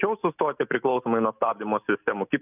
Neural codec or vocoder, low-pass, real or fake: none; 3.6 kHz; real